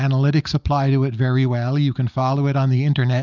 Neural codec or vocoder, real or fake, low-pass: none; real; 7.2 kHz